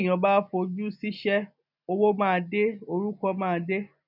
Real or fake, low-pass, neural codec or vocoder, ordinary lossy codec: real; 5.4 kHz; none; none